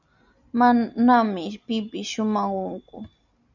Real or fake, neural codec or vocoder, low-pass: real; none; 7.2 kHz